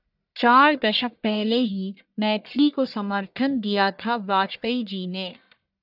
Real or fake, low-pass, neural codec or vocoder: fake; 5.4 kHz; codec, 44.1 kHz, 1.7 kbps, Pupu-Codec